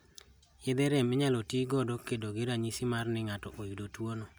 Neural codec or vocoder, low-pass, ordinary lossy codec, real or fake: none; none; none; real